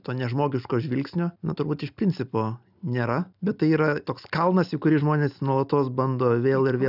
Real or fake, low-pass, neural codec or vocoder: real; 5.4 kHz; none